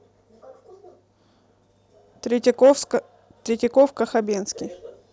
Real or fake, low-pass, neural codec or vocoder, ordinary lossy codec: real; none; none; none